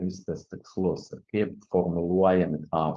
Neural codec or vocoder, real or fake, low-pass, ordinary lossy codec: codec, 16 kHz, 4.8 kbps, FACodec; fake; 7.2 kHz; Opus, 32 kbps